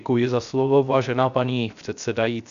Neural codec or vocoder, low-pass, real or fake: codec, 16 kHz, 0.3 kbps, FocalCodec; 7.2 kHz; fake